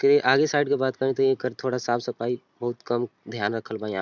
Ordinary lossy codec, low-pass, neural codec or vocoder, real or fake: none; 7.2 kHz; none; real